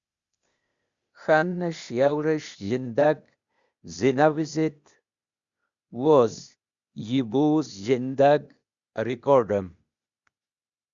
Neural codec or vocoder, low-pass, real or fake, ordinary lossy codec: codec, 16 kHz, 0.8 kbps, ZipCodec; 7.2 kHz; fake; Opus, 64 kbps